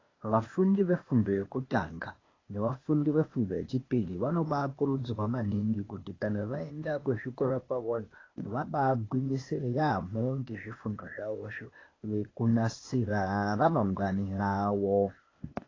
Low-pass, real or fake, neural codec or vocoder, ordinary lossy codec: 7.2 kHz; fake; codec, 16 kHz, 0.8 kbps, ZipCodec; AAC, 32 kbps